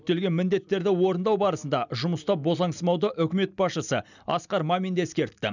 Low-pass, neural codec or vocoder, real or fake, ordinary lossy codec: 7.2 kHz; none; real; none